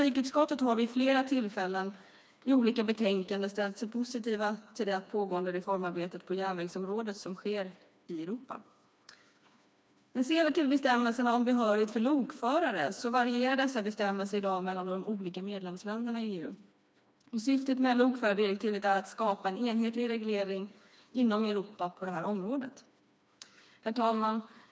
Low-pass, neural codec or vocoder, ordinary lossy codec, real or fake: none; codec, 16 kHz, 2 kbps, FreqCodec, smaller model; none; fake